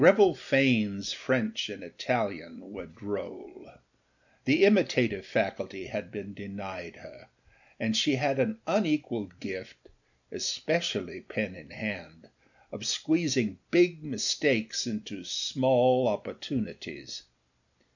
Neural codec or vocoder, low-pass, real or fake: none; 7.2 kHz; real